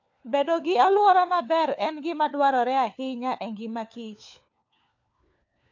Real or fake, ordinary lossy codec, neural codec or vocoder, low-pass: fake; AAC, 48 kbps; codec, 16 kHz, 4 kbps, FunCodec, trained on LibriTTS, 50 frames a second; 7.2 kHz